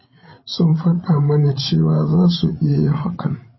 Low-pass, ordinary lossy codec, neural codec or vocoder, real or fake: 7.2 kHz; MP3, 24 kbps; codec, 16 kHz, 16 kbps, FreqCodec, larger model; fake